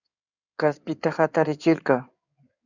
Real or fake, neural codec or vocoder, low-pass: fake; codec, 16 kHz in and 24 kHz out, 2.2 kbps, FireRedTTS-2 codec; 7.2 kHz